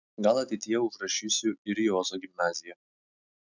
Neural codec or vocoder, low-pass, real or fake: none; 7.2 kHz; real